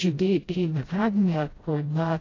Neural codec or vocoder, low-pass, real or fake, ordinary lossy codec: codec, 16 kHz, 0.5 kbps, FreqCodec, smaller model; 7.2 kHz; fake; AAC, 32 kbps